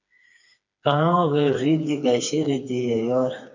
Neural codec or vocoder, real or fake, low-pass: codec, 16 kHz, 4 kbps, FreqCodec, smaller model; fake; 7.2 kHz